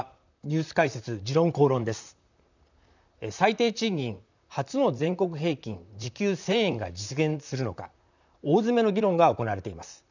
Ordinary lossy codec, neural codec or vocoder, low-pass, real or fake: none; vocoder, 44.1 kHz, 128 mel bands, Pupu-Vocoder; 7.2 kHz; fake